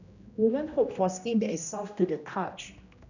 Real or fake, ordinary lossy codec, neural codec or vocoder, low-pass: fake; none; codec, 16 kHz, 1 kbps, X-Codec, HuBERT features, trained on general audio; 7.2 kHz